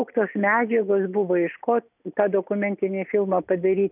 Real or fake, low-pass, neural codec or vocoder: real; 3.6 kHz; none